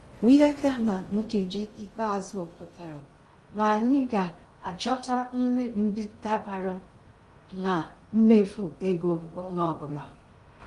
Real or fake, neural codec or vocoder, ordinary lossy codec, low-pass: fake; codec, 16 kHz in and 24 kHz out, 0.6 kbps, FocalCodec, streaming, 2048 codes; Opus, 32 kbps; 10.8 kHz